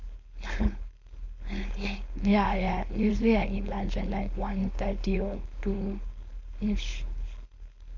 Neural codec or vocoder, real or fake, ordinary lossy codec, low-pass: codec, 16 kHz, 4.8 kbps, FACodec; fake; none; 7.2 kHz